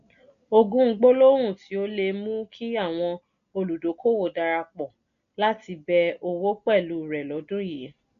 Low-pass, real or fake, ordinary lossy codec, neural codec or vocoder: 7.2 kHz; real; none; none